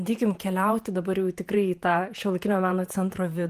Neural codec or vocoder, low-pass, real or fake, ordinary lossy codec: vocoder, 48 kHz, 128 mel bands, Vocos; 14.4 kHz; fake; Opus, 24 kbps